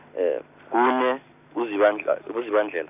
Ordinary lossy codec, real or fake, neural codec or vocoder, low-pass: none; real; none; 3.6 kHz